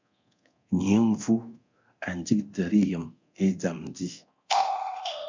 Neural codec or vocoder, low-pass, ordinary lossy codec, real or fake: codec, 24 kHz, 0.9 kbps, DualCodec; 7.2 kHz; MP3, 64 kbps; fake